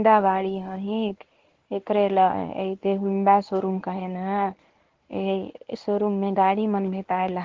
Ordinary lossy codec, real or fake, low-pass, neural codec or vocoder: Opus, 16 kbps; fake; 7.2 kHz; codec, 24 kHz, 0.9 kbps, WavTokenizer, medium speech release version 1